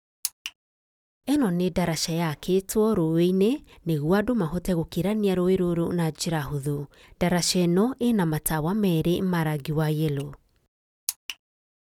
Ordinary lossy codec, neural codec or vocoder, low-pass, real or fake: none; none; 19.8 kHz; real